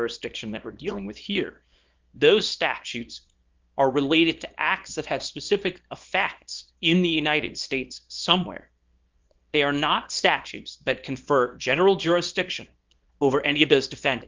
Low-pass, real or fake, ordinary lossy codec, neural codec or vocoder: 7.2 kHz; fake; Opus, 24 kbps; codec, 24 kHz, 0.9 kbps, WavTokenizer, small release